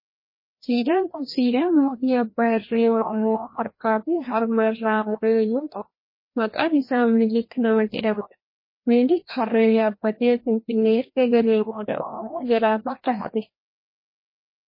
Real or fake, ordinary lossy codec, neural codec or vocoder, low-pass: fake; MP3, 24 kbps; codec, 16 kHz, 1 kbps, FreqCodec, larger model; 5.4 kHz